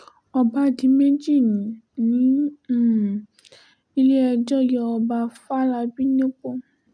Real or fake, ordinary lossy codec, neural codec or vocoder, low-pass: real; none; none; none